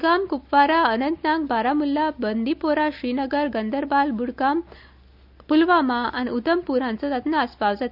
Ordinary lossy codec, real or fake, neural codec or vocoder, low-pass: none; real; none; 5.4 kHz